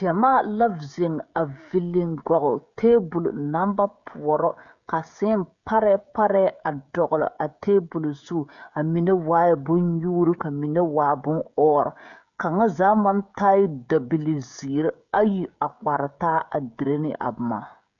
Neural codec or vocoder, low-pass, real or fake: codec, 16 kHz, 16 kbps, FreqCodec, smaller model; 7.2 kHz; fake